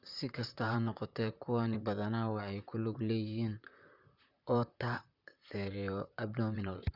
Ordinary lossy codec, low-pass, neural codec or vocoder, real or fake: Opus, 64 kbps; 5.4 kHz; vocoder, 44.1 kHz, 128 mel bands, Pupu-Vocoder; fake